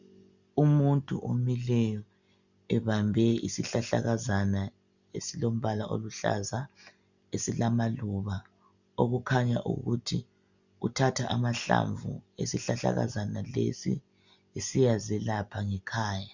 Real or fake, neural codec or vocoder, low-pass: real; none; 7.2 kHz